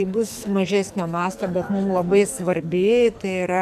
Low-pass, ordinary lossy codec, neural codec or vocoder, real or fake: 14.4 kHz; MP3, 96 kbps; codec, 44.1 kHz, 2.6 kbps, SNAC; fake